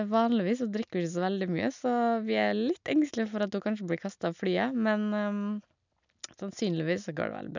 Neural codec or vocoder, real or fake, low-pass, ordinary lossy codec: none; real; 7.2 kHz; none